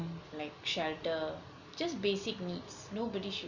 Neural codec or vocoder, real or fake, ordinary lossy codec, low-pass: none; real; none; 7.2 kHz